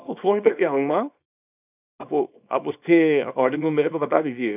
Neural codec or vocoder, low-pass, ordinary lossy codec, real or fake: codec, 24 kHz, 0.9 kbps, WavTokenizer, small release; 3.6 kHz; none; fake